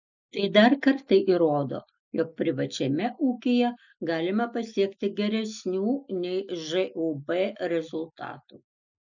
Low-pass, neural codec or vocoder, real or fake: 7.2 kHz; none; real